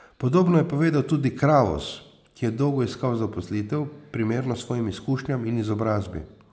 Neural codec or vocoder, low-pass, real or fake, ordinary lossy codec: none; none; real; none